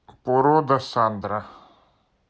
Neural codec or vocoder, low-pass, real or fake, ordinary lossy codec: none; none; real; none